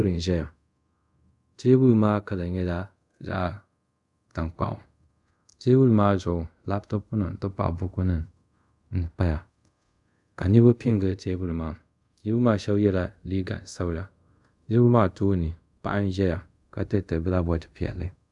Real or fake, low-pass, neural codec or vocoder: fake; 10.8 kHz; codec, 24 kHz, 0.5 kbps, DualCodec